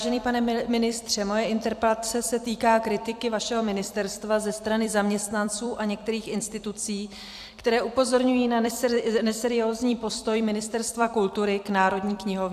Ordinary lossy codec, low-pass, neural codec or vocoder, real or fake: AAC, 96 kbps; 14.4 kHz; vocoder, 44.1 kHz, 128 mel bands every 256 samples, BigVGAN v2; fake